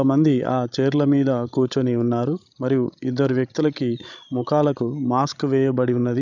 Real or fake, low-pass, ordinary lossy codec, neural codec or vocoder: fake; 7.2 kHz; none; codec, 16 kHz, 16 kbps, FreqCodec, larger model